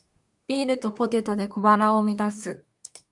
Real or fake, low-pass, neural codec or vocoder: fake; 10.8 kHz; codec, 24 kHz, 1 kbps, SNAC